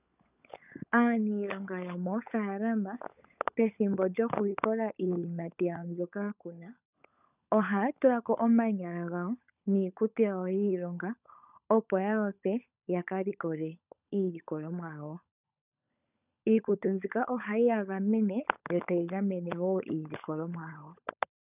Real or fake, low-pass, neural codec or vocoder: fake; 3.6 kHz; codec, 16 kHz, 16 kbps, FunCodec, trained on LibriTTS, 50 frames a second